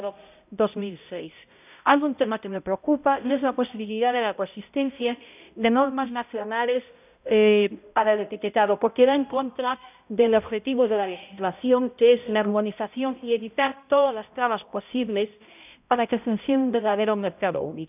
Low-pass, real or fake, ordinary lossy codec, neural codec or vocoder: 3.6 kHz; fake; none; codec, 16 kHz, 0.5 kbps, X-Codec, HuBERT features, trained on balanced general audio